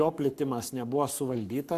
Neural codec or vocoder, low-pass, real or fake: codec, 44.1 kHz, 7.8 kbps, Pupu-Codec; 14.4 kHz; fake